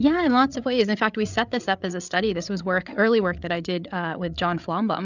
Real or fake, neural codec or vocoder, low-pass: fake; codec, 16 kHz, 8 kbps, FreqCodec, larger model; 7.2 kHz